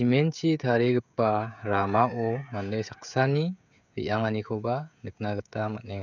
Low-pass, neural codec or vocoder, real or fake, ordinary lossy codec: 7.2 kHz; codec, 16 kHz, 16 kbps, FreqCodec, smaller model; fake; none